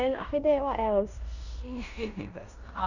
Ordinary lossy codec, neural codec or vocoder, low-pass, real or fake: none; codec, 16 kHz in and 24 kHz out, 0.9 kbps, LongCat-Audio-Codec, fine tuned four codebook decoder; 7.2 kHz; fake